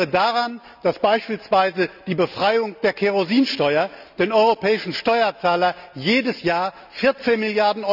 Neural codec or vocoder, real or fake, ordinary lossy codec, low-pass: none; real; none; 5.4 kHz